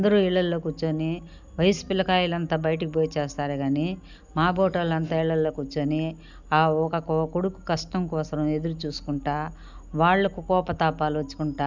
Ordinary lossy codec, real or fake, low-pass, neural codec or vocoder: none; real; 7.2 kHz; none